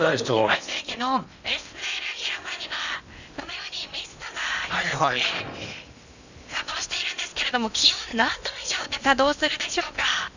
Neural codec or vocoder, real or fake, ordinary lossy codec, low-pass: codec, 16 kHz in and 24 kHz out, 0.8 kbps, FocalCodec, streaming, 65536 codes; fake; none; 7.2 kHz